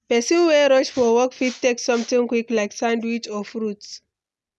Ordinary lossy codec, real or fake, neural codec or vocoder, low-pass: none; real; none; none